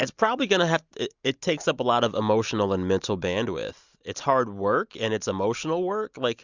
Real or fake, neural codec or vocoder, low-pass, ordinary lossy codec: real; none; 7.2 kHz; Opus, 64 kbps